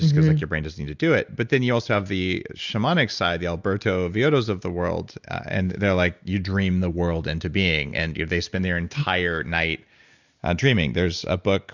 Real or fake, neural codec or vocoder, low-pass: real; none; 7.2 kHz